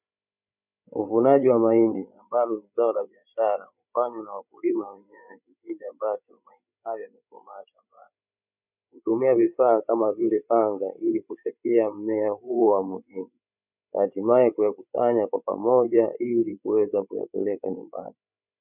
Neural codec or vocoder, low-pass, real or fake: codec, 16 kHz, 8 kbps, FreqCodec, larger model; 3.6 kHz; fake